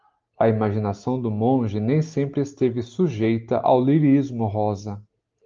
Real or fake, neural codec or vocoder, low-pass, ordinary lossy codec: real; none; 7.2 kHz; Opus, 32 kbps